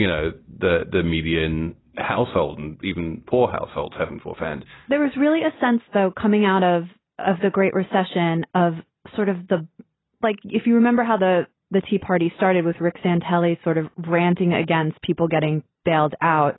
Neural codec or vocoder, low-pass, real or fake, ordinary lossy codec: none; 7.2 kHz; real; AAC, 16 kbps